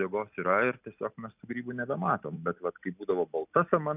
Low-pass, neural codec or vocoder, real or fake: 3.6 kHz; none; real